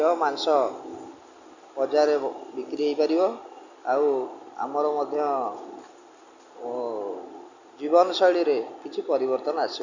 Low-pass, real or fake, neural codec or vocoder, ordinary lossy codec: 7.2 kHz; real; none; Opus, 64 kbps